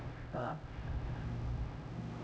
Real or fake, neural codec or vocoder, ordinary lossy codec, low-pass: fake; codec, 16 kHz, 0.5 kbps, X-Codec, HuBERT features, trained on LibriSpeech; none; none